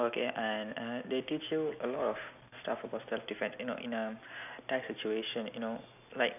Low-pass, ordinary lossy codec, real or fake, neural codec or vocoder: 3.6 kHz; none; real; none